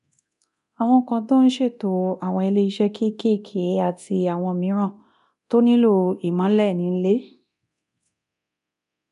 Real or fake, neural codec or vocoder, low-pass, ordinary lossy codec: fake; codec, 24 kHz, 0.9 kbps, DualCodec; 10.8 kHz; none